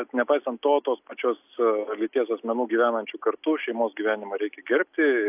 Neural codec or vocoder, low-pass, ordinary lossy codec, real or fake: none; 3.6 kHz; AAC, 32 kbps; real